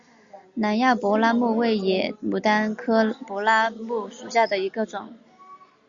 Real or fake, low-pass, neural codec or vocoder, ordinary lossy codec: real; 7.2 kHz; none; Opus, 64 kbps